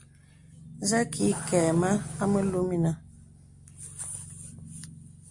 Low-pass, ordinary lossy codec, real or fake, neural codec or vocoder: 10.8 kHz; MP3, 64 kbps; real; none